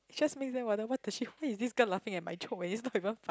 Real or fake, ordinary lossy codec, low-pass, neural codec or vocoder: real; none; none; none